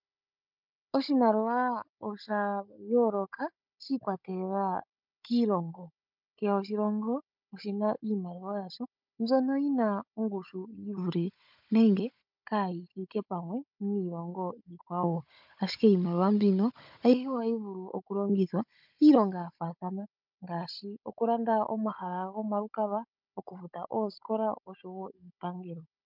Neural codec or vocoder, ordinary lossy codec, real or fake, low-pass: codec, 16 kHz, 16 kbps, FunCodec, trained on Chinese and English, 50 frames a second; MP3, 48 kbps; fake; 5.4 kHz